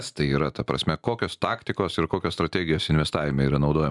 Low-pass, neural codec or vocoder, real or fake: 10.8 kHz; none; real